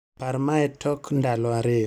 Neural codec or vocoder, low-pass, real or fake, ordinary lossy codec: none; 19.8 kHz; real; none